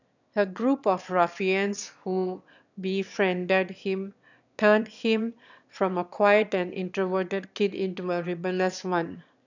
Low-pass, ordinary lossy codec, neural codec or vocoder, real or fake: 7.2 kHz; none; autoencoder, 22.05 kHz, a latent of 192 numbers a frame, VITS, trained on one speaker; fake